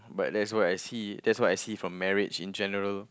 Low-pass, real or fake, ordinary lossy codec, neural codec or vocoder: none; real; none; none